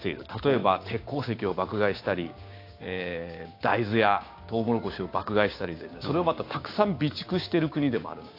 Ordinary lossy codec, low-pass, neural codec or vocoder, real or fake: AAC, 32 kbps; 5.4 kHz; codec, 16 kHz, 6 kbps, DAC; fake